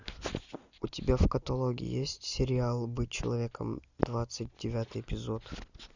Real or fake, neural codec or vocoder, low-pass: real; none; 7.2 kHz